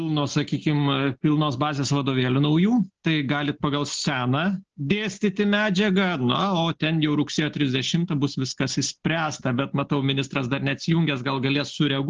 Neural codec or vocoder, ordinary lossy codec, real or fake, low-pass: none; Opus, 16 kbps; real; 7.2 kHz